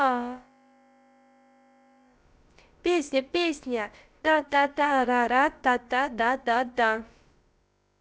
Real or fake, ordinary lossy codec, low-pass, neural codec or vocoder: fake; none; none; codec, 16 kHz, about 1 kbps, DyCAST, with the encoder's durations